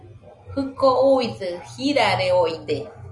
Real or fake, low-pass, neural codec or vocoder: real; 10.8 kHz; none